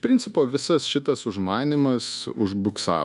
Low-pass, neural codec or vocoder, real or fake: 10.8 kHz; codec, 24 kHz, 1.2 kbps, DualCodec; fake